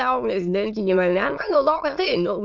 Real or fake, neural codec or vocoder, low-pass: fake; autoencoder, 22.05 kHz, a latent of 192 numbers a frame, VITS, trained on many speakers; 7.2 kHz